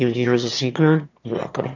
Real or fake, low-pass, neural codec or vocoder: fake; 7.2 kHz; autoencoder, 22.05 kHz, a latent of 192 numbers a frame, VITS, trained on one speaker